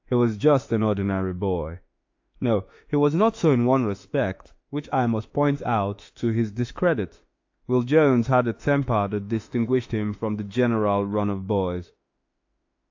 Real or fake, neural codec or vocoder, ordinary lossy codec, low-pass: fake; autoencoder, 48 kHz, 32 numbers a frame, DAC-VAE, trained on Japanese speech; AAC, 48 kbps; 7.2 kHz